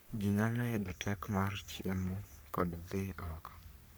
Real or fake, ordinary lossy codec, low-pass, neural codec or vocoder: fake; none; none; codec, 44.1 kHz, 3.4 kbps, Pupu-Codec